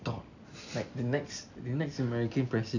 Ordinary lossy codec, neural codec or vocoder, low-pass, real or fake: none; none; 7.2 kHz; real